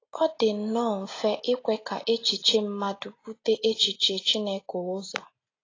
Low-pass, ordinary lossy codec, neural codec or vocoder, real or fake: 7.2 kHz; AAC, 32 kbps; none; real